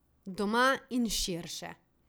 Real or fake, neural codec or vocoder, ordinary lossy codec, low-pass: real; none; none; none